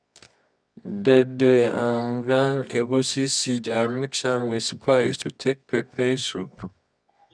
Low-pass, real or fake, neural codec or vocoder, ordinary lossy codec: 9.9 kHz; fake; codec, 24 kHz, 0.9 kbps, WavTokenizer, medium music audio release; none